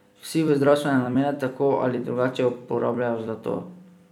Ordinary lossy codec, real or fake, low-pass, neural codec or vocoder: none; fake; 19.8 kHz; vocoder, 44.1 kHz, 128 mel bands every 256 samples, BigVGAN v2